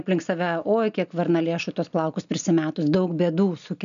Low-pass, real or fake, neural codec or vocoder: 7.2 kHz; real; none